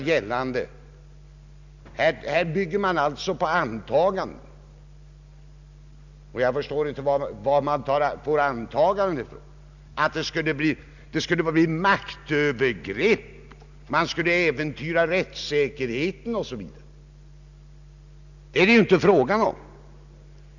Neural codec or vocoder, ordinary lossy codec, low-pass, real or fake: none; none; 7.2 kHz; real